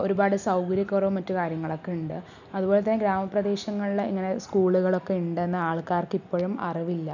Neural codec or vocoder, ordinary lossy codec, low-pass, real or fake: none; none; 7.2 kHz; real